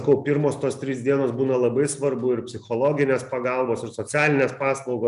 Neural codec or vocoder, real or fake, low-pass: none; real; 10.8 kHz